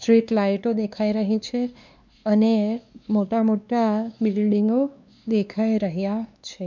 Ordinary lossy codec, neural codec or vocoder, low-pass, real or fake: none; codec, 16 kHz, 2 kbps, X-Codec, WavLM features, trained on Multilingual LibriSpeech; 7.2 kHz; fake